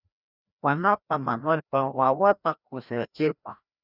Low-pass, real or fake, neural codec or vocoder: 5.4 kHz; fake; codec, 16 kHz, 1 kbps, FreqCodec, larger model